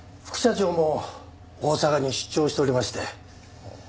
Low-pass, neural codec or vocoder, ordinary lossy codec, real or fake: none; none; none; real